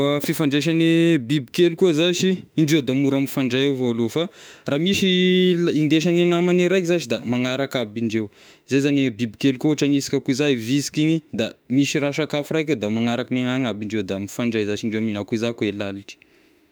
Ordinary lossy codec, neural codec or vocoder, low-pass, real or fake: none; autoencoder, 48 kHz, 32 numbers a frame, DAC-VAE, trained on Japanese speech; none; fake